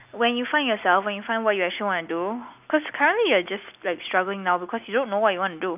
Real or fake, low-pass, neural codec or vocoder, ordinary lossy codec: real; 3.6 kHz; none; none